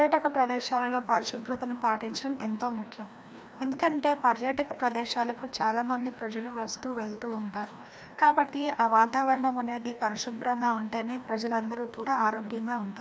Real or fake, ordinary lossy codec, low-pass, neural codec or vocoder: fake; none; none; codec, 16 kHz, 1 kbps, FreqCodec, larger model